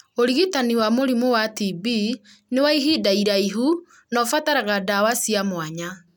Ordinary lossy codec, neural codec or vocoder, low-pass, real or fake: none; none; none; real